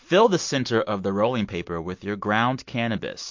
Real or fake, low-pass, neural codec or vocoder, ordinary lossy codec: real; 7.2 kHz; none; MP3, 48 kbps